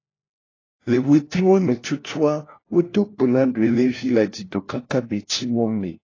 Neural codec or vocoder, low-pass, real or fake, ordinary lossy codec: codec, 16 kHz, 1 kbps, FunCodec, trained on LibriTTS, 50 frames a second; 7.2 kHz; fake; AAC, 32 kbps